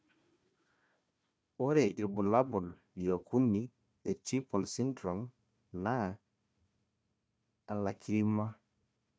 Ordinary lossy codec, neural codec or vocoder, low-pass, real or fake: none; codec, 16 kHz, 1 kbps, FunCodec, trained on Chinese and English, 50 frames a second; none; fake